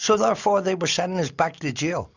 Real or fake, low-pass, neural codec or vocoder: real; 7.2 kHz; none